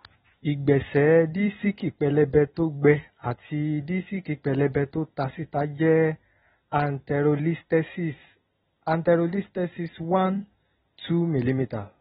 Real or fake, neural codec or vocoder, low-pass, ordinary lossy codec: real; none; 19.8 kHz; AAC, 16 kbps